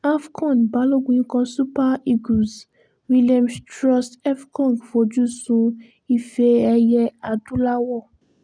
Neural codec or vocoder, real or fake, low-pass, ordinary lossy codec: none; real; 9.9 kHz; none